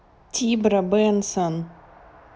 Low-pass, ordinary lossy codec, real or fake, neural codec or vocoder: none; none; real; none